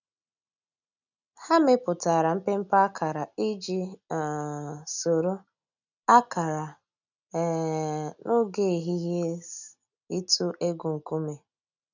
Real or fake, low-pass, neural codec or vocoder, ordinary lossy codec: real; 7.2 kHz; none; none